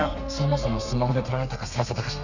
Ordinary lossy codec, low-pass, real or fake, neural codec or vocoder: none; 7.2 kHz; fake; codec, 32 kHz, 1.9 kbps, SNAC